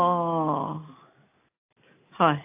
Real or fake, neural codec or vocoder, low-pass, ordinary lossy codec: real; none; 3.6 kHz; AAC, 32 kbps